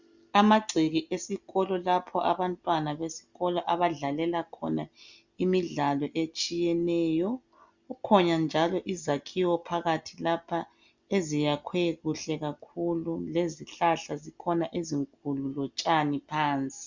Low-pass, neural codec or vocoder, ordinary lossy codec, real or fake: 7.2 kHz; none; Opus, 64 kbps; real